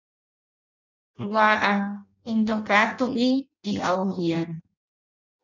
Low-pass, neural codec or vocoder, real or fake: 7.2 kHz; codec, 16 kHz in and 24 kHz out, 0.6 kbps, FireRedTTS-2 codec; fake